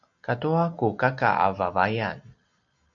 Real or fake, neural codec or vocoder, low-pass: real; none; 7.2 kHz